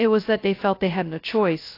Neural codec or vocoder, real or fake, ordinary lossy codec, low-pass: codec, 16 kHz, 0.2 kbps, FocalCodec; fake; AAC, 32 kbps; 5.4 kHz